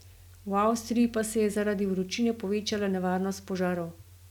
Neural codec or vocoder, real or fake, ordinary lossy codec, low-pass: none; real; none; 19.8 kHz